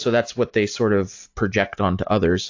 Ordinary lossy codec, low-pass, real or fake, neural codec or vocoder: AAC, 48 kbps; 7.2 kHz; real; none